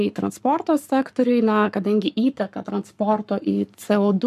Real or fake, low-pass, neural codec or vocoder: fake; 14.4 kHz; codec, 44.1 kHz, 7.8 kbps, Pupu-Codec